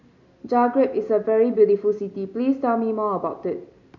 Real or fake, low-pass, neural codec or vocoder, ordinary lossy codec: real; 7.2 kHz; none; none